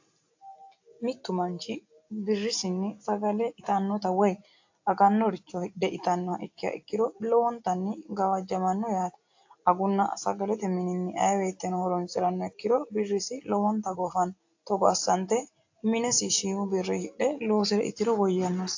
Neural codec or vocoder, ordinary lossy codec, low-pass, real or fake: none; AAC, 48 kbps; 7.2 kHz; real